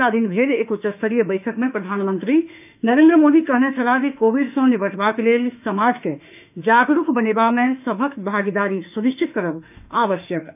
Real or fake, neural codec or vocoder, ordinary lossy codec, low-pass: fake; autoencoder, 48 kHz, 32 numbers a frame, DAC-VAE, trained on Japanese speech; none; 3.6 kHz